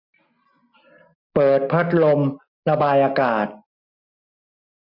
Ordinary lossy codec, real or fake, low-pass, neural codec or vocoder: MP3, 48 kbps; real; 5.4 kHz; none